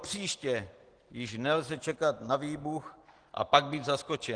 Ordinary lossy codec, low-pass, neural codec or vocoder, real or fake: Opus, 16 kbps; 9.9 kHz; none; real